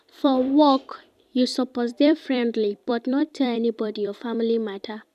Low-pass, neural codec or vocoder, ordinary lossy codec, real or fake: 14.4 kHz; vocoder, 44.1 kHz, 128 mel bands every 256 samples, BigVGAN v2; none; fake